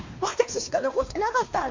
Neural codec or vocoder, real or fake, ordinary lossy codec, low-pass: codec, 16 kHz, 2 kbps, X-Codec, WavLM features, trained on Multilingual LibriSpeech; fake; MP3, 64 kbps; 7.2 kHz